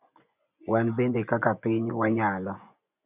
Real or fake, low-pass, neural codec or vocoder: fake; 3.6 kHz; vocoder, 24 kHz, 100 mel bands, Vocos